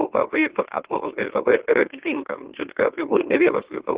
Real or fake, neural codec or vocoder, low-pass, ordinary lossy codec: fake; autoencoder, 44.1 kHz, a latent of 192 numbers a frame, MeloTTS; 3.6 kHz; Opus, 16 kbps